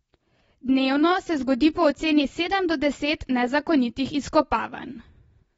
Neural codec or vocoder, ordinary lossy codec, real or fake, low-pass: none; AAC, 24 kbps; real; 19.8 kHz